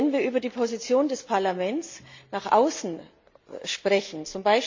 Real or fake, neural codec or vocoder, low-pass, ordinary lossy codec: real; none; 7.2 kHz; MP3, 48 kbps